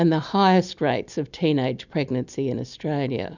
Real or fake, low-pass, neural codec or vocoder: real; 7.2 kHz; none